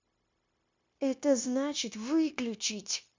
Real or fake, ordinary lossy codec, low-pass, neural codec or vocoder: fake; MP3, 64 kbps; 7.2 kHz; codec, 16 kHz, 0.9 kbps, LongCat-Audio-Codec